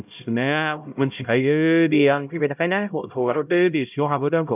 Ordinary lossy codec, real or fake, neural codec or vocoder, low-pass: none; fake; codec, 16 kHz, 0.5 kbps, X-Codec, HuBERT features, trained on LibriSpeech; 3.6 kHz